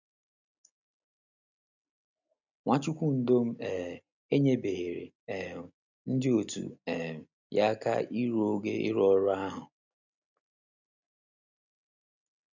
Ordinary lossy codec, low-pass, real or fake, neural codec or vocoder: none; 7.2 kHz; real; none